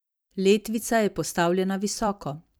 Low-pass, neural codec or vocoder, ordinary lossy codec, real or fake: none; vocoder, 44.1 kHz, 128 mel bands every 256 samples, BigVGAN v2; none; fake